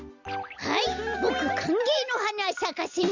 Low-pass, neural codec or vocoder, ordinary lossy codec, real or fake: 7.2 kHz; none; Opus, 64 kbps; real